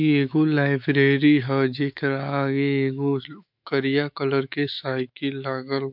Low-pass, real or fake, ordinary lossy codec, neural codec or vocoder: 5.4 kHz; fake; MP3, 48 kbps; autoencoder, 48 kHz, 128 numbers a frame, DAC-VAE, trained on Japanese speech